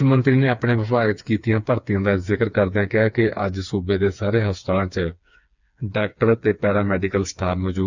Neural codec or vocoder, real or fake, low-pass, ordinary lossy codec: codec, 16 kHz, 4 kbps, FreqCodec, smaller model; fake; 7.2 kHz; none